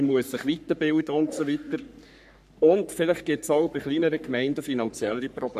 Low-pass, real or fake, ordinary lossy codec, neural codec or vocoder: 14.4 kHz; fake; none; codec, 44.1 kHz, 3.4 kbps, Pupu-Codec